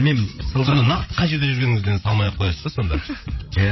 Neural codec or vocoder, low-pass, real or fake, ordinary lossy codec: codec, 16 kHz, 16 kbps, FreqCodec, larger model; 7.2 kHz; fake; MP3, 24 kbps